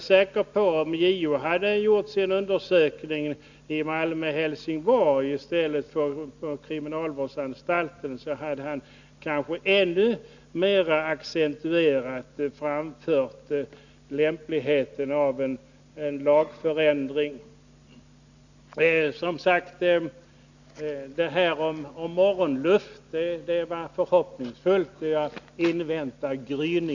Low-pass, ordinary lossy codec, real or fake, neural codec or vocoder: 7.2 kHz; none; real; none